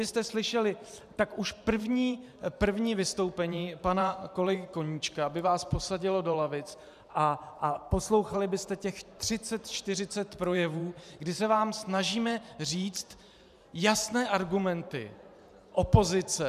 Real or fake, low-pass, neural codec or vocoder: fake; 14.4 kHz; vocoder, 44.1 kHz, 128 mel bands every 512 samples, BigVGAN v2